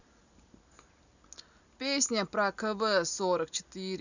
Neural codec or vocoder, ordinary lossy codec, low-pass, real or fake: none; none; 7.2 kHz; real